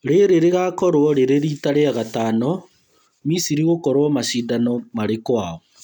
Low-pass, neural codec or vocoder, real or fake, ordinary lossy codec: 19.8 kHz; none; real; none